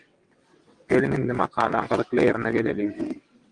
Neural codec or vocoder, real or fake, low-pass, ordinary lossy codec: vocoder, 22.05 kHz, 80 mel bands, WaveNeXt; fake; 9.9 kHz; Opus, 24 kbps